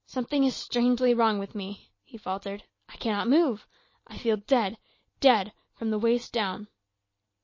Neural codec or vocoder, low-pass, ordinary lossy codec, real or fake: none; 7.2 kHz; MP3, 32 kbps; real